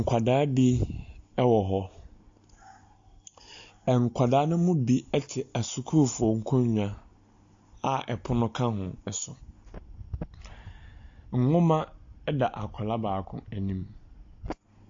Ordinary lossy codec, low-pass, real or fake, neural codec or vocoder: AAC, 48 kbps; 7.2 kHz; real; none